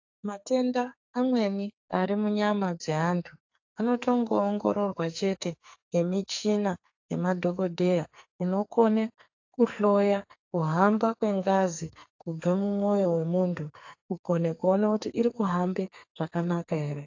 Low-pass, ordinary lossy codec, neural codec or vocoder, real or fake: 7.2 kHz; AAC, 48 kbps; codec, 44.1 kHz, 2.6 kbps, SNAC; fake